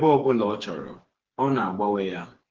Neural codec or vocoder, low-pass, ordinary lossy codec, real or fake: codec, 16 kHz, 4 kbps, FreqCodec, smaller model; 7.2 kHz; Opus, 16 kbps; fake